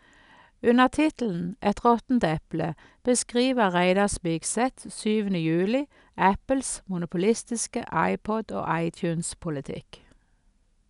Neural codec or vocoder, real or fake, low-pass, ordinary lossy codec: none; real; 10.8 kHz; none